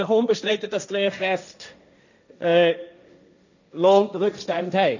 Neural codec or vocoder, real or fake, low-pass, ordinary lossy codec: codec, 16 kHz, 1.1 kbps, Voila-Tokenizer; fake; 7.2 kHz; none